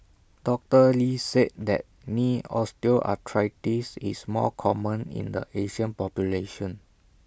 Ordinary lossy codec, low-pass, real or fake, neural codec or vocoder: none; none; real; none